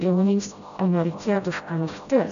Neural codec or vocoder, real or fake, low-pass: codec, 16 kHz, 0.5 kbps, FreqCodec, smaller model; fake; 7.2 kHz